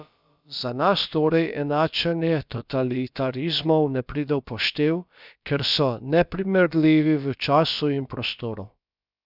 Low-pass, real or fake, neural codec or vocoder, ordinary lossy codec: 5.4 kHz; fake; codec, 16 kHz, about 1 kbps, DyCAST, with the encoder's durations; none